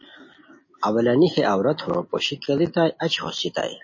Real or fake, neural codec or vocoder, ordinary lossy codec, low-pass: real; none; MP3, 32 kbps; 7.2 kHz